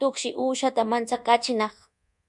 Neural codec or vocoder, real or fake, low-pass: codec, 24 kHz, 1.2 kbps, DualCodec; fake; 10.8 kHz